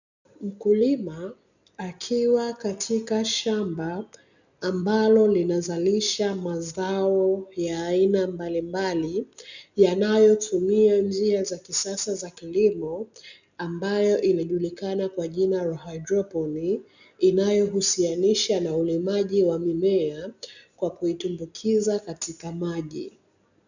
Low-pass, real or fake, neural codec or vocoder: 7.2 kHz; real; none